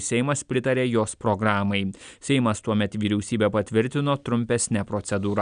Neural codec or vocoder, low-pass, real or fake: none; 9.9 kHz; real